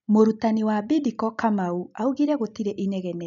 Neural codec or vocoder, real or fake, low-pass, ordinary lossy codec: none; real; 7.2 kHz; none